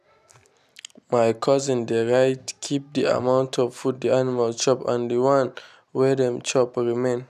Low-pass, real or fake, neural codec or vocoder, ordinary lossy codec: 14.4 kHz; real; none; none